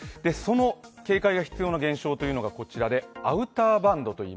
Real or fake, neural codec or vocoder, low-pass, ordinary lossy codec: real; none; none; none